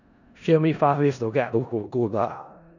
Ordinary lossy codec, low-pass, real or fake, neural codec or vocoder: none; 7.2 kHz; fake; codec, 16 kHz in and 24 kHz out, 0.4 kbps, LongCat-Audio-Codec, four codebook decoder